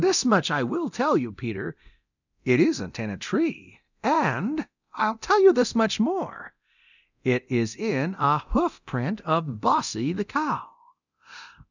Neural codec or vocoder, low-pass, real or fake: codec, 24 kHz, 0.9 kbps, DualCodec; 7.2 kHz; fake